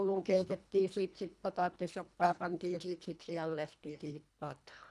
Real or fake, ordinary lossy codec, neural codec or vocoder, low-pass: fake; none; codec, 24 kHz, 1.5 kbps, HILCodec; none